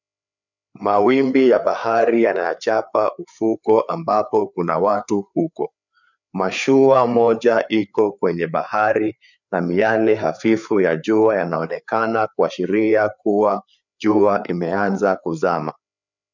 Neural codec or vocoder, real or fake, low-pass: codec, 16 kHz, 4 kbps, FreqCodec, larger model; fake; 7.2 kHz